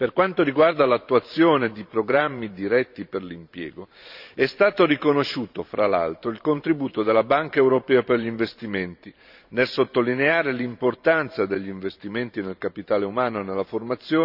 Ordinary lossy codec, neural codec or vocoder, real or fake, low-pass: none; vocoder, 44.1 kHz, 128 mel bands every 512 samples, BigVGAN v2; fake; 5.4 kHz